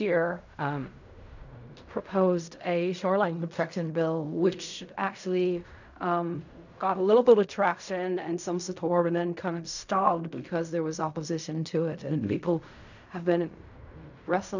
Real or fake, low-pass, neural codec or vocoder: fake; 7.2 kHz; codec, 16 kHz in and 24 kHz out, 0.4 kbps, LongCat-Audio-Codec, fine tuned four codebook decoder